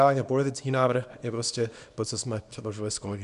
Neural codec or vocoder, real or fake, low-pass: codec, 24 kHz, 0.9 kbps, WavTokenizer, small release; fake; 10.8 kHz